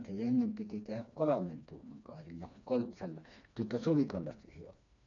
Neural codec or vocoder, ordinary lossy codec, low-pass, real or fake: codec, 16 kHz, 2 kbps, FreqCodec, smaller model; none; 7.2 kHz; fake